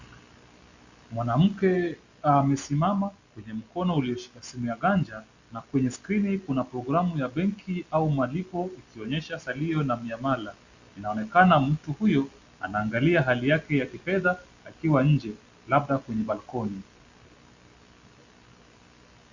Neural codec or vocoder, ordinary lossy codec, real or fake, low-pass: none; Opus, 64 kbps; real; 7.2 kHz